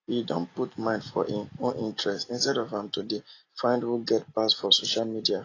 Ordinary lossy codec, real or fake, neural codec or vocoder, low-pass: AAC, 32 kbps; real; none; 7.2 kHz